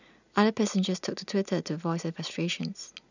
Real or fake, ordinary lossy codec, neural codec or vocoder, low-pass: real; none; none; 7.2 kHz